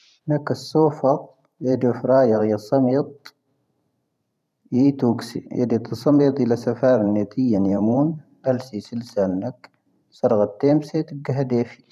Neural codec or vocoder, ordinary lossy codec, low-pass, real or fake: vocoder, 44.1 kHz, 128 mel bands every 512 samples, BigVGAN v2; none; 14.4 kHz; fake